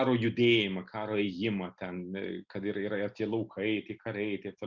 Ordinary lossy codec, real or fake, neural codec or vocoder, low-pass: Opus, 64 kbps; real; none; 7.2 kHz